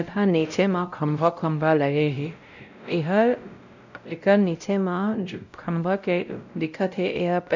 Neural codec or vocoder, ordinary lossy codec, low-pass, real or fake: codec, 16 kHz, 0.5 kbps, X-Codec, WavLM features, trained on Multilingual LibriSpeech; none; 7.2 kHz; fake